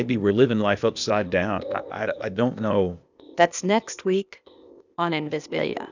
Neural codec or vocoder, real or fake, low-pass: codec, 16 kHz, 0.8 kbps, ZipCodec; fake; 7.2 kHz